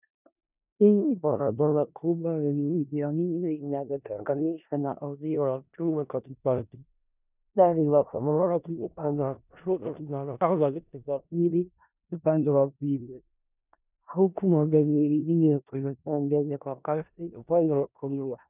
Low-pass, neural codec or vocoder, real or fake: 3.6 kHz; codec, 16 kHz in and 24 kHz out, 0.4 kbps, LongCat-Audio-Codec, four codebook decoder; fake